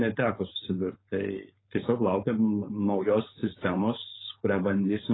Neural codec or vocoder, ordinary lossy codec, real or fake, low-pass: codec, 16 kHz, 4.8 kbps, FACodec; AAC, 16 kbps; fake; 7.2 kHz